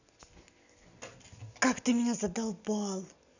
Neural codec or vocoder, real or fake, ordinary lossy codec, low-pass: none; real; none; 7.2 kHz